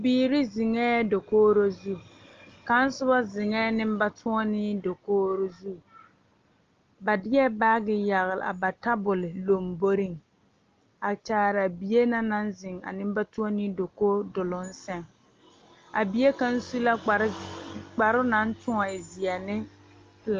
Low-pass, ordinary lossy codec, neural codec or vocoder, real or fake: 7.2 kHz; Opus, 32 kbps; none; real